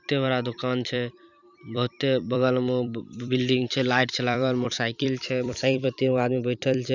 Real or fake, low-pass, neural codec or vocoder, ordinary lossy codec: fake; 7.2 kHz; vocoder, 44.1 kHz, 128 mel bands every 256 samples, BigVGAN v2; none